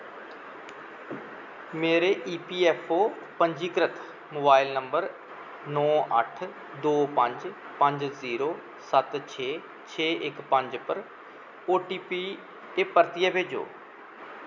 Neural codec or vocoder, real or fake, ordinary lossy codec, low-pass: none; real; none; 7.2 kHz